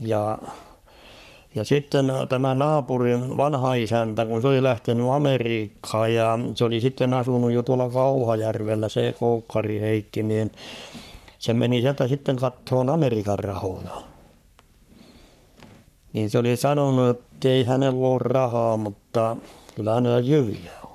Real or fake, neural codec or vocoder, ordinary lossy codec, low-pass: fake; codec, 44.1 kHz, 3.4 kbps, Pupu-Codec; none; 14.4 kHz